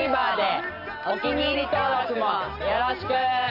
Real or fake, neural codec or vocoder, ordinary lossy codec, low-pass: real; none; none; 5.4 kHz